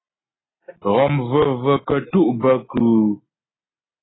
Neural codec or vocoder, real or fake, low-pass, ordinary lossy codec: none; real; 7.2 kHz; AAC, 16 kbps